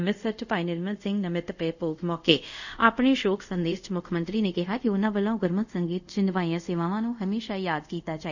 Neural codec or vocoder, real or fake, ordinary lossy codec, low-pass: codec, 24 kHz, 0.5 kbps, DualCodec; fake; none; 7.2 kHz